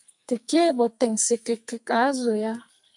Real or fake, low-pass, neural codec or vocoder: fake; 10.8 kHz; codec, 44.1 kHz, 2.6 kbps, SNAC